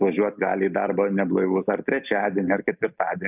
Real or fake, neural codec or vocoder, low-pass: real; none; 3.6 kHz